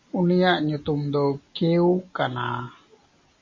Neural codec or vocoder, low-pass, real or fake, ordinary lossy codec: none; 7.2 kHz; real; MP3, 32 kbps